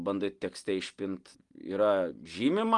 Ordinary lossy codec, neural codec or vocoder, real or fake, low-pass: Opus, 24 kbps; none; real; 10.8 kHz